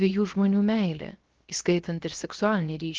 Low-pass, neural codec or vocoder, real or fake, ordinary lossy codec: 7.2 kHz; codec, 16 kHz, 0.7 kbps, FocalCodec; fake; Opus, 16 kbps